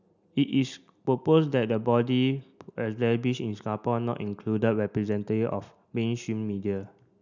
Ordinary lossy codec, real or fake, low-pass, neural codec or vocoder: none; real; 7.2 kHz; none